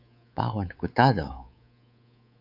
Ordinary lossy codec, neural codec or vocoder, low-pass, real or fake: AAC, 48 kbps; codec, 24 kHz, 3.1 kbps, DualCodec; 5.4 kHz; fake